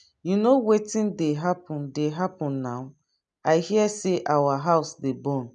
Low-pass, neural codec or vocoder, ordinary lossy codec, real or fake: 9.9 kHz; none; none; real